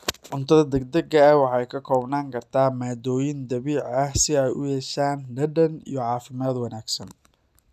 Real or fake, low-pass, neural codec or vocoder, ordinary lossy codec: real; 14.4 kHz; none; none